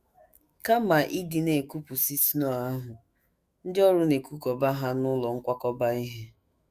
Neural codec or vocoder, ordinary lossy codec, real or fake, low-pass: autoencoder, 48 kHz, 128 numbers a frame, DAC-VAE, trained on Japanese speech; Opus, 64 kbps; fake; 14.4 kHz